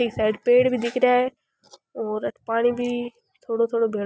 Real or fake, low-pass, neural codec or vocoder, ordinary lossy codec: real; none; none; none